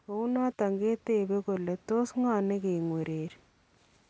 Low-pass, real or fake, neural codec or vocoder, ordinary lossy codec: none; real; none; none